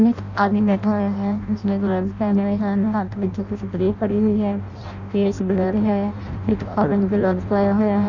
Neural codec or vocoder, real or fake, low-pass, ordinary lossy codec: codec, 16 kHz in and 24 kHz out, 0.6 kbps, FireRedTTS-2 codec; fake; 7.2 kHz; none